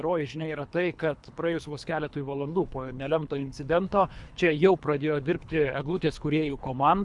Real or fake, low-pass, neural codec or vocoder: fake; 10.8 kHz; codec, 24 kHz, 3 kbps, HILCodec